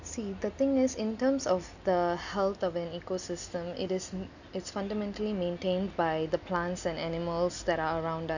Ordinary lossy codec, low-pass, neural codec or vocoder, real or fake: none; 7.2 kHz; none; real